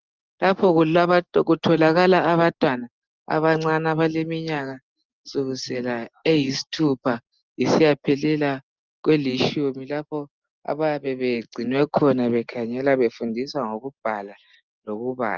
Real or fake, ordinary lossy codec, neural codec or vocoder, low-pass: real; Opus, 24 kbps; none; 7.2 kHz